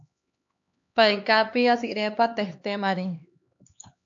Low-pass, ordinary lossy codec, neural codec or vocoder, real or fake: 7.2 kHz; AAC, 64 kbps; codec, 16 kHz, 2 kbps, X-Codec, HuBERT features, trained on LibriSpeech; fake